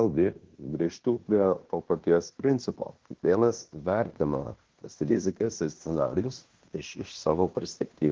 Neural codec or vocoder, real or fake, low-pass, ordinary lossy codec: codec, 16 kHz in and 24 kHz out, 0.9 kbps, LongCat-Audio-Codec, fine tuned four codebook decoder; fake; 7.2 kHz; Opus, 16 kbps